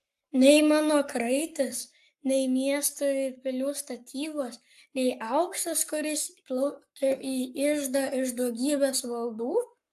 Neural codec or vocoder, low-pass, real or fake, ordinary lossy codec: codec, 44.1 kHz, 7.8 kbps, Pupu-Codec; 14.4 kHz; fake; AAC, 96 kbps